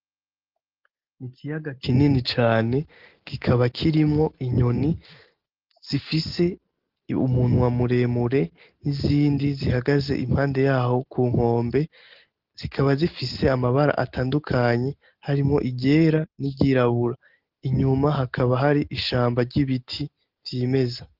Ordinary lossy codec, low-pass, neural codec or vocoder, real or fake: Opus, 16 kbps; 5.4 kHz; none; real